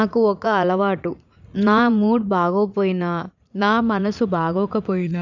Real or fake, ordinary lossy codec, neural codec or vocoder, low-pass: fake; none; vocoder, 44.1 kHz, 80 mel bands, Vocos; 7.2 kHz